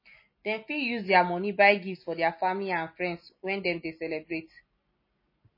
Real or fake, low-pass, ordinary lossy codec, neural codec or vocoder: real; 5.4 kHz; MP3, 24 kbps; none